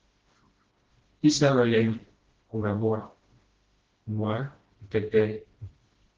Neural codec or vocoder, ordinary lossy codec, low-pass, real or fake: codec, 16 kHz, 1 kbps, FreqCodec, smaller model; Opus, 16 kbps; 7.2 kHz; fake